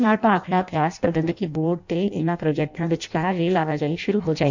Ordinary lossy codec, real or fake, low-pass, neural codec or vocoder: none; fake; 7.2 kHz; codec, 16 kHz in and 24 kHz out, 0.6 kbps, FireRedTTS-2 codec